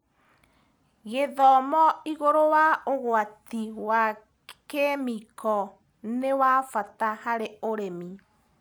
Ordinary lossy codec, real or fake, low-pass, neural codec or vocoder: none; real; none; none